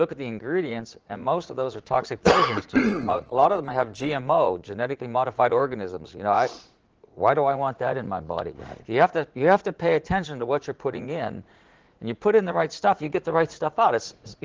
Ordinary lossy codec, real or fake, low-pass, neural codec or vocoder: Opus, 16 kbps; fake; 7.2 kHz; vocoder, 44.1 kHz, 80 mel bands, Vocos